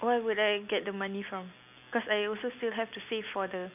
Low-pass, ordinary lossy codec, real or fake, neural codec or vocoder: 3.6 kHz; none; real; none